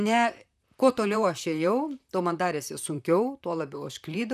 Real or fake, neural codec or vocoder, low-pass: fake; vocoder, 44.1 kHz, 128 mel bands, Pupu-Vocoder; 14.4 kHz